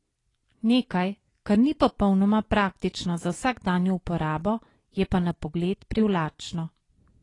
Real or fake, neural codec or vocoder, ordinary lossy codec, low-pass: real; none; AAC, 32 kbps; 10.8 kHz